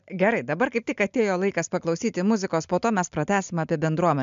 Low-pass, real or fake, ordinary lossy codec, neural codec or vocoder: 7.2 kHz; real; MP3, 64 kbps; none